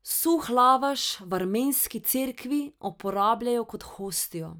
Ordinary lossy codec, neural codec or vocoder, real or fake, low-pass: none; none; real; none